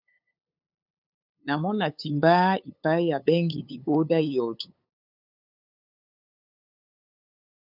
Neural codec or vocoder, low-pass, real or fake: codec, 16 kHz, 8 kbps, FunCodec, trained on LibriTTS, 25 frames a second; 5.4 kHz; fake